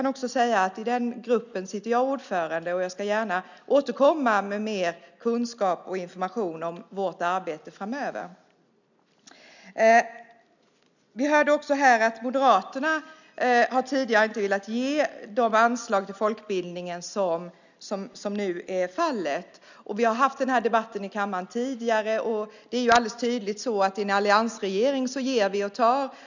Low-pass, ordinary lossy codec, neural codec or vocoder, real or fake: 7.2 kHz; none; none; real